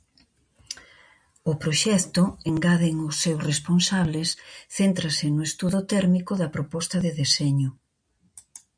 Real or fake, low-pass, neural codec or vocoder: real; 9.9 kHz; none